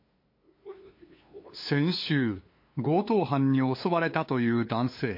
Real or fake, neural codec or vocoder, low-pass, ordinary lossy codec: fake; codec, 16 kHz, 2 kbps, FunCodec, trained on LibriTTS, 25 frames a second; 5.4 kHz; MP3, 32 kbps